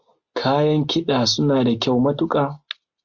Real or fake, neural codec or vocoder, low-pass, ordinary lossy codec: real; none; 7.2 kHz; Opus, 64 kbps